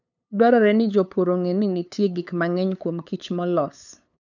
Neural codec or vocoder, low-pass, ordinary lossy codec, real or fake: codec, 16 kHz, 8 kbps, FunCodec, trained on LibriTTS, 25 frames a second; 7.2 kHz; none; fake